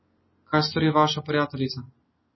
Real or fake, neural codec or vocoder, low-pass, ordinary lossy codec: real; none; 7.2 kHz; MP3, 24 kbps